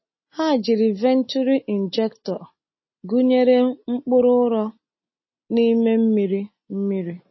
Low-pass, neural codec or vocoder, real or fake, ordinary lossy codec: 7.2 kHz; none; real; MP3, 24 kbps